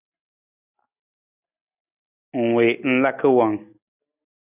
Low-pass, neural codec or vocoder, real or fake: 3.6 kHz; none; real